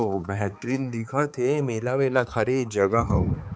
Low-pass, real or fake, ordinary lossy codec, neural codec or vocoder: none; fake; none; codec, 16 kHz, 4 kbps, X-Codec, HuBERT features, trained on balanced general audio